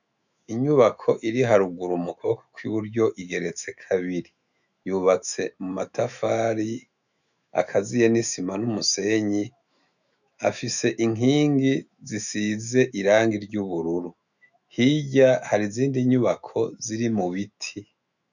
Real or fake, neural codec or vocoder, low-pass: fake; autoencoder, 48 kHz, 128 numbers a frame, DAC-VAE, trained on Japanese speech; 7.2 kHz